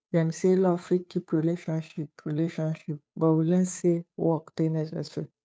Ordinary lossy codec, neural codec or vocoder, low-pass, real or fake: none; codec, 16 kHz, 2 kbps, FunCodec, trained on Chinese and English, 25 frames a second; none; fake